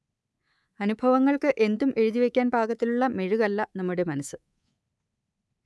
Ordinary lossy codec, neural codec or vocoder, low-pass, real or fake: none; codec, 24 kHz, 3.1 kbps, DualCodec; none; fake